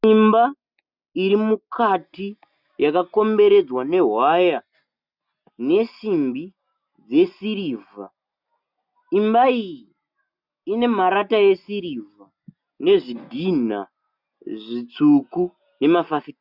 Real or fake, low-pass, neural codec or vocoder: real; 5.4 kHz; none